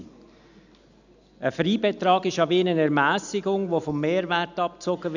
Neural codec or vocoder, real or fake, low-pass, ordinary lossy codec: none; real; 7.2 kHz; none